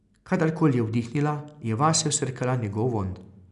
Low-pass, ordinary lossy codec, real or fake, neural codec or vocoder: 10.8 kHz; none; real; none